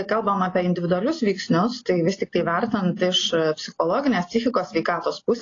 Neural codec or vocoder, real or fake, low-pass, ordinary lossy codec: none; real; 7.2 kHz; AAC, 32 kbps